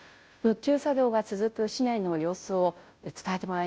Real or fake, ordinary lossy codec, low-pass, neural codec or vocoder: fake; none; none; codec, 16 kHz, 0.5 kbps, FunCodec, trained on Chinese and English, 25 frames a second